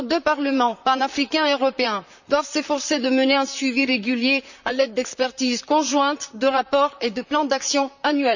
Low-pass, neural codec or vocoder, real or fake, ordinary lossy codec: 7.2 kHz; vocoder, 44.1 kHz, 128 mel bands, Pupu-Vocoder; fake; none